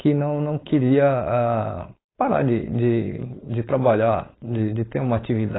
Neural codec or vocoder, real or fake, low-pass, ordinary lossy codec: codec, 16 kHz, 4.8 kbps, FACodec; fake; 7.2 kHz; AAC, 16 kbps